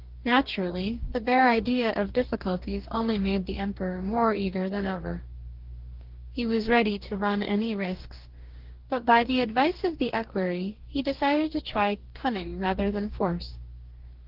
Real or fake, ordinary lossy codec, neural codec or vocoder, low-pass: fake; Opus, 16 kbps; codec, 44.1 kHz, 2.6 kbps, DAC; 5.4 kHz